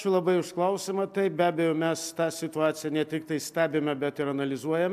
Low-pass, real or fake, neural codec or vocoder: 14.4 kHz; real; none